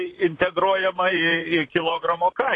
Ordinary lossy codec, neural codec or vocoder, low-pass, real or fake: AAC, 32 kbps; vocoder, 44.1 kHz, 128 mel bands, Pupu-Vocoder; 10.8 kHz; fake